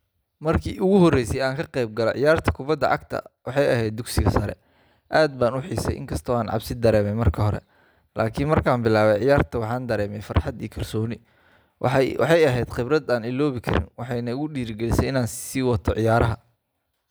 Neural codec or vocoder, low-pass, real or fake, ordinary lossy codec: none; none; real; none